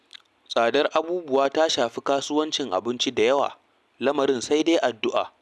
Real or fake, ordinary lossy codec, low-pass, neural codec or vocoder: real; none; none; none